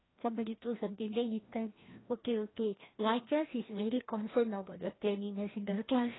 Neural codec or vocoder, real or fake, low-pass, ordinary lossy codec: codec, 16 kHz, 1 kbps, FreqCodec, larger model; fake; 7.2 kHz; AAC, 16 kbps